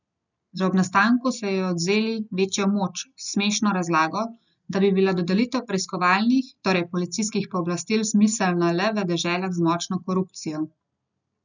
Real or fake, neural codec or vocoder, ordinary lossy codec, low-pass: real; none; none; 7.2 kHz